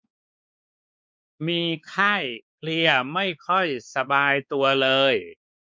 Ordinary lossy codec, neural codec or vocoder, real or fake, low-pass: none; codec, 16 kHz, 4 kbps, X-Codec, WavLM features, trained on Multilingual LibriSpeech; fake; none